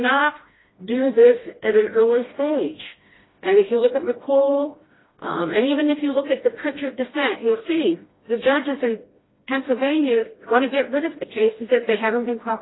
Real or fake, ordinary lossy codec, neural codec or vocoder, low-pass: fake; AAC, 16 kbps; codec, 16 kHz, 1 kbps, FreqCodec, smaller model; 7.2 kHz